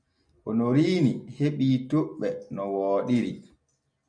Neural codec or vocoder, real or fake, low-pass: none; real; 9.9 kHz